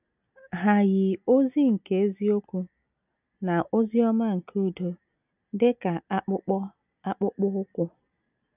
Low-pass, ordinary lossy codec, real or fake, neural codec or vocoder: 3.6 kHz; none; real; none